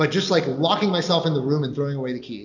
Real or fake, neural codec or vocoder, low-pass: real; none; 7.2 kHz